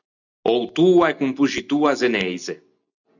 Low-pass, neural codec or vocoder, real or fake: 7.2 kHz; none; real